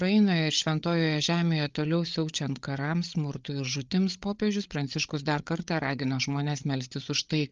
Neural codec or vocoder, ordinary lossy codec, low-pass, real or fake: codec, 16 kHz, 8 kbps, FreqCodec, larger model; Opus, 32 kbps; 7.2 kHz; fake